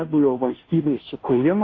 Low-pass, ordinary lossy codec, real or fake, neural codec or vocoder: 7.2 kHz; AAC, 32 kbps; fake; codec, 16 kHz, 0.5 kbps, FunCodec, trained on Chinese and English, 25 frames a second